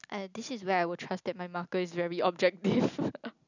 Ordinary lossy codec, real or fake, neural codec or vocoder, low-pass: none; real; none; 7.2 kHz